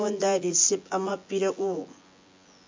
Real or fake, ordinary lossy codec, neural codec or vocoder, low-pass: fake; MP3, 48 kbps; vocoder, 24 kHz, 100 mel bands, Vocos; 7.2 kHz